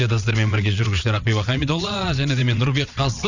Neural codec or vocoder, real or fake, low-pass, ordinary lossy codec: vocoder, 22.05 kHz, 80 mel bands, WaveNeXt; fake; 7.2 kHz; none